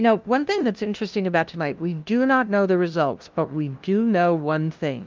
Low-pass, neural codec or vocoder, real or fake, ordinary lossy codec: 7.2 kHz; codec, 16 kHz, 0.5 kbps, FunCodec, trained on LibriTTS, 25 frames a second; fake; Opus, 24 kbps